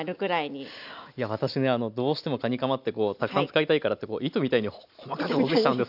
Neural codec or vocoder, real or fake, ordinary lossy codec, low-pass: none; real; none; 5.4 kHz